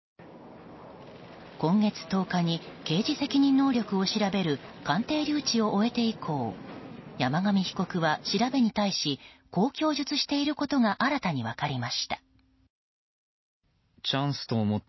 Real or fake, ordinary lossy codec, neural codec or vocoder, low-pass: real; MP3, 24 kbps; none; 7.2 kHz